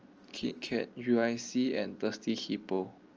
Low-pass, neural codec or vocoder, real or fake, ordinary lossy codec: 7.2 kHz; none; real; Opus, 24 kbps